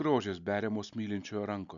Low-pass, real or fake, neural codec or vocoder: 7.2 kHz; real; none